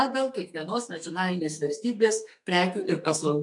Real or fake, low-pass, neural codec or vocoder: fake; 10.8 kHz; codec, 32 kHz, 1.9 kbps, SNAC